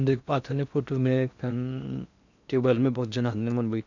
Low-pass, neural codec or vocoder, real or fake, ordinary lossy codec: 7.2 kHz; codec, 16 kHz in and 24 kHz out, 0.8 kbps, FocalCodec, streaming, 65536 codes; fake; none